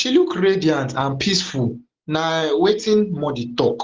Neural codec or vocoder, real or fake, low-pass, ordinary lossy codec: none; real; 7.2 kHz; Opus, 16 kbps